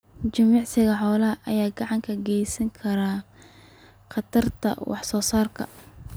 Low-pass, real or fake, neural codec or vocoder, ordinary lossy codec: none; real; none; none